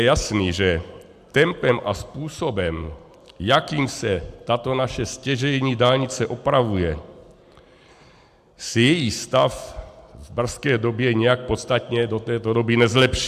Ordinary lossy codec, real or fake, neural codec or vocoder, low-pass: AAC, 96 kbps; real; none; 14.4 kHz